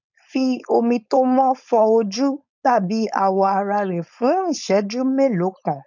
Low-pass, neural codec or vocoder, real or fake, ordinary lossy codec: 7.2 kHz; codec, 16 kHz, 4.8 kbps, FACodec; fake; none